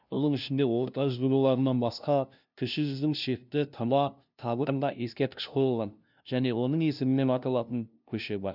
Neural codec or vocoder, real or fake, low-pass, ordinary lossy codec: codec, 16 kHz, 0.5 kbps, FunCodec, trained on LibriTTS, 25 frames a second; fake; 5.4 kHz; none